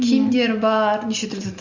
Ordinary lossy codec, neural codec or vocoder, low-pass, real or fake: Opus, 64 kbps; none; 7.2 kHz; real